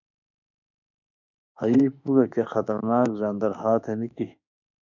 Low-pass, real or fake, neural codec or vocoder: 7.2 kHz; fake; autoencoder, 48 kHz, 32 numbers a frame, DAC-VAE, trained on Japanese speech